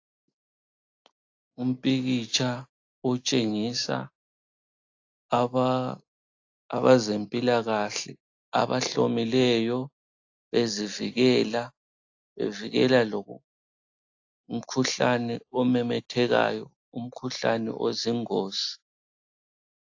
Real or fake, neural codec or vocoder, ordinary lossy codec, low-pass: real; none; AAC, 48 kbps; 7.2 kHz